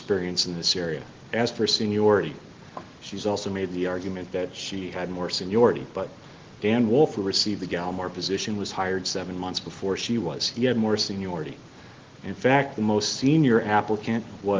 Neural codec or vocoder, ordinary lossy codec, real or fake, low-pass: none; Opus, 16 kbps; real; 7.2 kHz